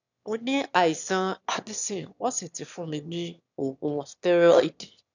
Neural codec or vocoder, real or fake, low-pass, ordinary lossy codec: autoencoder, 22.05 kHz, a latent of 192 numbers a frame, VITS, trained on one speaker; fake; 7.2 kHz; none